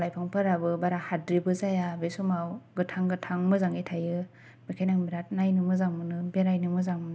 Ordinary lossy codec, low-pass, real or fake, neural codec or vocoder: none; none; real; none